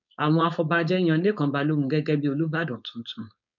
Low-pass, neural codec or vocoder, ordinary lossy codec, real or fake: 7.2 kHz; codec, 16 kHz, 4.8 kbps, FACodec; none; fake